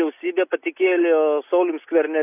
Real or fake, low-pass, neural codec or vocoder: real; 3.6 kHz; none